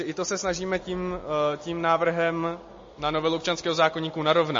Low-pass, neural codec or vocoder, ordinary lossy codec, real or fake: 7.2 kHz; none; MP3, 32 kbps; real